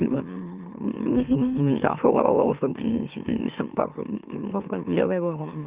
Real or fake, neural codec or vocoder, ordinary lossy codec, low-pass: fake; autoencoder, 44.1 kHz, a latent of 192 numbers a frame, MeloTTS; Opus, 24 kbps; 3.6 kHz